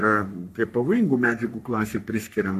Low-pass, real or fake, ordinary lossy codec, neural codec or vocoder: 14.4 kHz; fake; MP3, 64 kbps; codec, 44.1 kHz, 3.4 kbps, Pupu-Codec